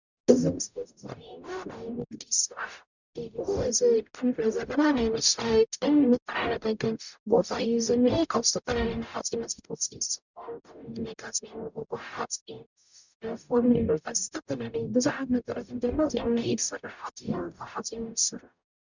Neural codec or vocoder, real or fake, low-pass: codec, 44.1 kHz, 0.9 kbps, DAC; fake; 7.2 kHz